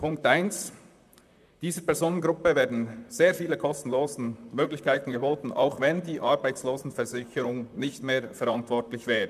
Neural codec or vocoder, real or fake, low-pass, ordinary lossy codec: vocoder, 44.1 kHz, 128 mel bands, Pupu-Vocoder; fake; 14.4 kHz; none